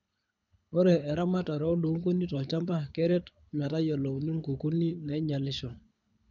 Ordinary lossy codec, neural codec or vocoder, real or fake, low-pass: none; codec, 24 kHz, 6 kbps, HILCodec; fake; 7.2 kHz